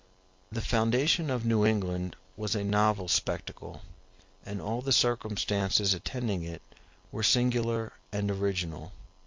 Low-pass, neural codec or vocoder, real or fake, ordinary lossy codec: 7.2 kHz; none; real; MP3, 48 kbps